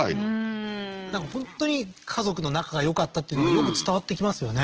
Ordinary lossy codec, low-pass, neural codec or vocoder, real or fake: Opus, 16 kbps; 7.2 kHz; none; real